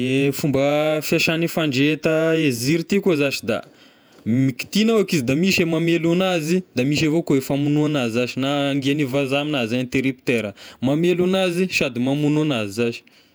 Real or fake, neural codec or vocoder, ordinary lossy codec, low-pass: fake; vocoder, 48 kHz, 128 mel bands, Vocos; none; none